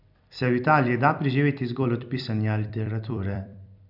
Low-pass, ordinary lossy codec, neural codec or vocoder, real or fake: 5.4 kHz; none; vocoder, 44.1 kHz, 128 mel bands every 256 samples, BigVGAN v2; fake